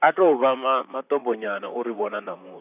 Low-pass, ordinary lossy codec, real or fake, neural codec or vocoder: 3.6 kHz; none; fake; vocoder, 44.1 kHz, 128 mel bands, Pupu-Vocoder